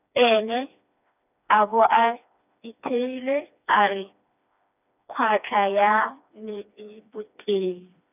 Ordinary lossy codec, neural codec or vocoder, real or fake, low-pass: none; codec, 16 kHz, 2 kbps, FreqCodec, smaller model; fake; 3.6 kHz